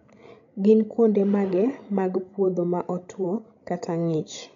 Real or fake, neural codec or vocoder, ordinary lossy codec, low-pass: fake; codec, 16 kHz, 16 kbps, FreqCodec, larger model; none; 7.2 kHz